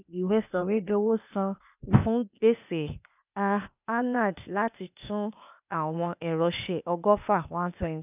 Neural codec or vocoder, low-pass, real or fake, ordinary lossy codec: codec, 16 kHz, 0.8 kbps, ZipCodec; 3.6 kHz; fake; none